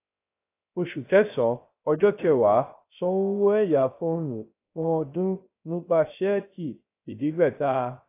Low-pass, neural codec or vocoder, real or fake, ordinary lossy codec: 3.6 kHz; codec, 16 kHz, 0.3 kbps, FocalCodec; fake; AAC, 24 kbps